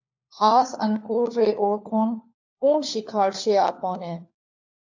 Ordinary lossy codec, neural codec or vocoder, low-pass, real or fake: AAC, 48 kbps; codec, 16 kHz, 4 kbps, FunCodec, trained on LibriTTS, 50 frames a second; 7.2 kHz; fake